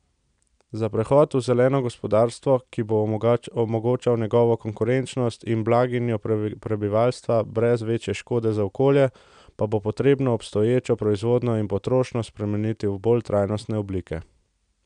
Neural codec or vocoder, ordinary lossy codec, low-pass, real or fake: none; none; 9.9 kHz; real